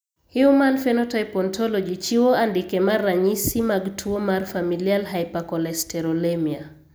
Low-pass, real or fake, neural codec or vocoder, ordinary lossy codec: none; real; none; none